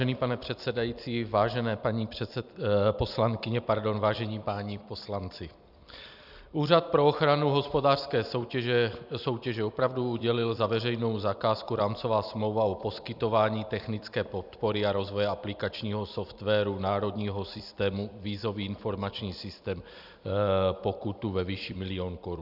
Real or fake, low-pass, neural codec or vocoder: fake; 5.4 kHz; vocoder, 44.1 kHz, 128 mel bands every 256 samples, BigVGAN v2